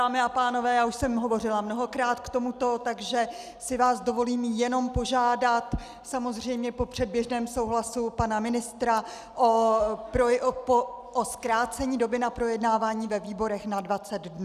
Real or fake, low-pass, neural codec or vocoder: real; 14.4 kHz; none